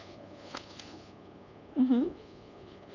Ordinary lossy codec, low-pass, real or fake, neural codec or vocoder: none; 7.2 kHz; fake; codec, 24 kHz, 1.2 kbps, DualCodec